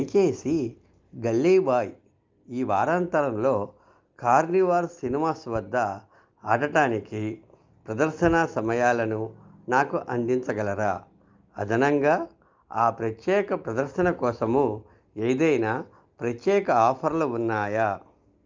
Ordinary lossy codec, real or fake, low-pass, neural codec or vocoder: Opus, 24 kbps; real; 7.2 kHz; none